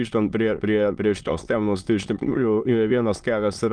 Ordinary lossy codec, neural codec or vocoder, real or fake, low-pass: Opus, 32 kbps; autoencoder, 22.05 kHz, a latent of 192 numbers a frame, VITS, trained on many speakers; fake; 9.9 kHz